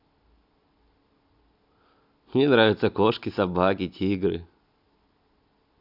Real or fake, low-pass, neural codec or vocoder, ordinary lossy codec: real; 5.4 kHz; none; none